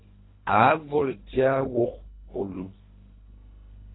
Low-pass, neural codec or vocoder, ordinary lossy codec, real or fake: 7.2 kHz; codec, 24 kHz, 1.5 kbps, HILCodec; AAC, 16 kbps; fake